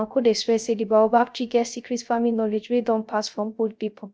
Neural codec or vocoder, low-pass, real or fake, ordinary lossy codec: codec, 16 kHz, 0.3 kbps, FocalCodec; none; fake; none